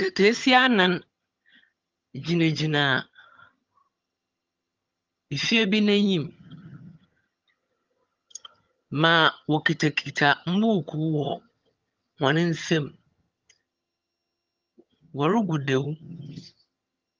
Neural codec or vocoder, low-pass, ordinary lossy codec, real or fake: vocoder, 22.05 kHz, 80 mel bands, HiFi-GAN; 7.2 kHz; Opus, 24 kbps; fake